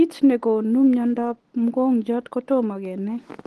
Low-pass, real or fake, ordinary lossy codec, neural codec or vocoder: 10.8 kHz; real; Opus, 16 kbps; none